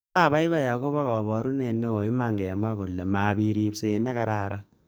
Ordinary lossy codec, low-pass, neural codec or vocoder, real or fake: none; none; codec, 44.1 kHz, 2.6 kbps, SNAC; fake